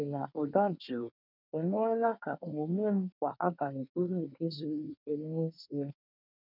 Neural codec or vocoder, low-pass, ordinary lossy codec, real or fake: codec, 24 kHz, 1 kbps, SNAC; 5.4 kHz; none; fake